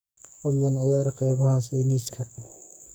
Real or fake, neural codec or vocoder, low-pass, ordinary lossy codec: fake; codec, 44.1 kHz, 2.6 kbps, SNAC; none; none